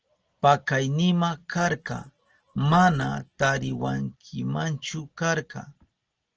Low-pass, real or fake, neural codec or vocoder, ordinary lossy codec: 7.2 kHz; real; none; Opus, 16 kbps